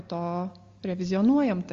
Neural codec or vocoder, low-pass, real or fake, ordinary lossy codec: none; 7.2 kHz; real; Opus, 32 kbps